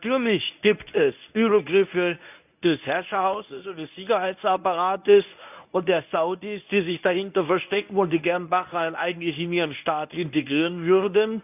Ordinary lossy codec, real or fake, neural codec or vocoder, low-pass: none; fake; codec, 24 kHz, 0.9 kbps, WavTokenizer, medium speech release version 1; 3.6 kHz